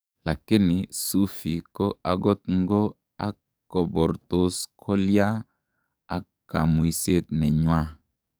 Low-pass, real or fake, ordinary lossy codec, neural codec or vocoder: none; fake; none; codec, 44.1 kHz, 7.8 kbps, DAC